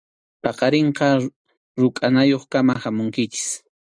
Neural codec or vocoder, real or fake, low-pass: none; real; 9.9 kHz